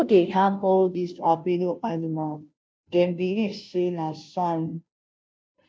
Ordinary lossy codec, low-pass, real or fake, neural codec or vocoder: none; none; fake; codec, 16 kHz, 0.5 kbps, FunCodec, trained on Chinese and English, 25 frames a second